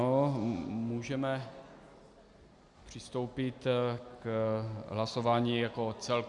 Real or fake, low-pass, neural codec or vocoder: real; 10.8 kHz; none